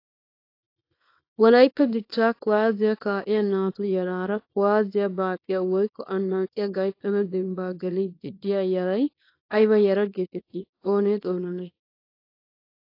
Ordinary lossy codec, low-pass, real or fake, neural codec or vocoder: AAC, 32 kbps; 5.4 kHz; fake; codec, 24 kHz, 0.9 kbps, WavTokenizer, small release